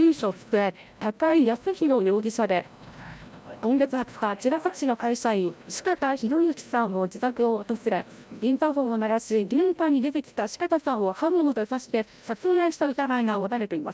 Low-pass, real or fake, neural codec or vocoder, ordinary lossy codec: none; fake; codec, 16 kHz, 0.5 kbps, FreqCodec, larger model; none